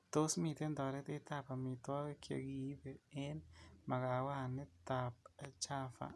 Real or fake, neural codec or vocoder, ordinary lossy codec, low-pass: real; none; none; none